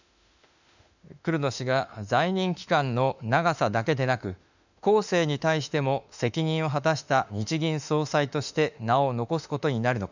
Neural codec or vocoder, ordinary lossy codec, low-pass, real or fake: autoencoder, 48 kHz, 32 numbers a frame, DAC-VAE, trained on Japanese speech; none; 7.2 kHz; fake